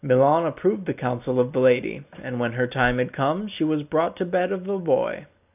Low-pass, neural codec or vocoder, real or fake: 3.6 kHz; none; real